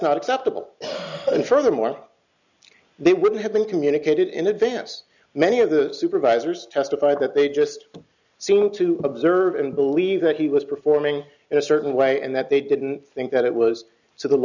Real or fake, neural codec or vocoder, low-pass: real; none; 7.2 kHz